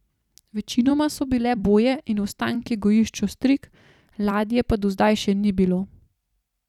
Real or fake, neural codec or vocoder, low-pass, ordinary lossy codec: fake; vocoder, 44.1 kHz, 128 mel bands every 256 samples, BigVGAN v2; 19.8 kHz; none